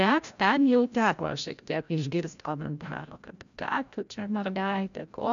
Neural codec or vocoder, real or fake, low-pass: codec, 16 kHz, 0.5 kbps, FreqCodec, larger model; fake; 7.2 kHz